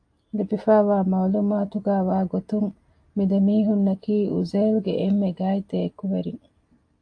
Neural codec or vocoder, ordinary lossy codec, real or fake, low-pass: none; AAC, 48 kbps; real; 9.9 kHz